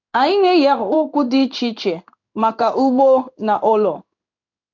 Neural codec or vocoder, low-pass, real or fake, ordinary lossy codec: codec, 16 kHz in and 24 kHz out, 1 kbps, XY-Tokenizer; 7.2 kHz; fake; none